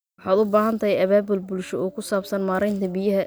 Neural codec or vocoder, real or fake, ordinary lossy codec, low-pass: none; real; none; none